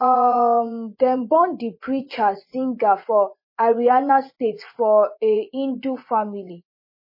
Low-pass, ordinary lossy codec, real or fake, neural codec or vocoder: 5.4 kHz; MP3, 24 kbps; fake; vocoder, 24 kHz, 100 mel bands, Vocos